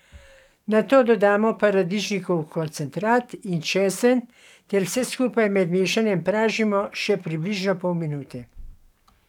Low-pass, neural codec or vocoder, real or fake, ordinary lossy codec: 19.8 kHz; codec, 44.1 kHz, 7.8 kbps, DAC; fake; none